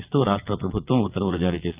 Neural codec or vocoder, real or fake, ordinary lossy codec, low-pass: vocoder, 22.05 kHz, 80 mel bands, WaveNeXt; fake; Opus, 24 kbps; 3.6 kHz